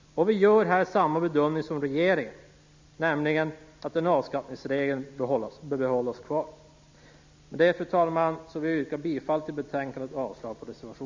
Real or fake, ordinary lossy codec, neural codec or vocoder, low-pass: real; MP3, 64 kbps; none; 7.2 kHz